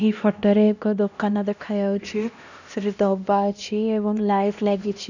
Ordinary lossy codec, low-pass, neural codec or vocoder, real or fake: none; 7.2 kHz; codec, 16 kHz, 1 kbps, X-Codec, HuBERT features, trained on LibriSpeech; fake